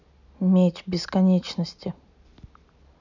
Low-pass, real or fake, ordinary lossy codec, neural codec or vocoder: 7.2 kHz; real; none; none